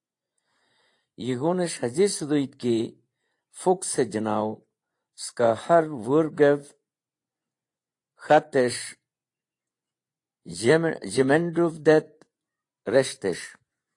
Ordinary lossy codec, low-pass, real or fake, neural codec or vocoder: AAC, 48 kbps; 10.8 kHz; fake; vocoder, 24 kHz, 100 mel bands, Vocos